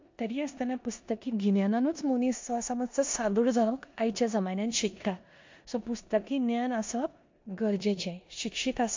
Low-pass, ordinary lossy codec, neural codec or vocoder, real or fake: 7.2 kHz; MP3, 48 kbps; codec, 16 kHz in and 24 kHz out, 0.9 kbps, LongCat-Audio-Codec, four codebook decoder; fake